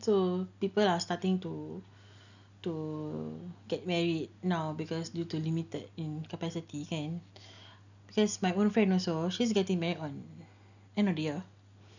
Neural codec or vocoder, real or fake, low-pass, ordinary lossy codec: none; real; 7.2 kHz; none